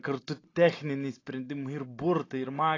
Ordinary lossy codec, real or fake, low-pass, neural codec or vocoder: AAC, 32 kbps; real; 7.2 kHz; none